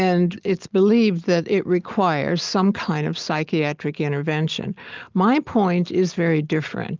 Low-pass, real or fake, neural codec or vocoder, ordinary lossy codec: 7.2 kHz; real; none; Opus, 32 kbps